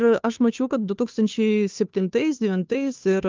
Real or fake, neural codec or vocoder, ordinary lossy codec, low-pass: fake; codec, 16 kHz, 2 kbps, FunCodec, trained on Chinese and English, 25 frames a second; Opus, 24 kbps; 7.2 kHz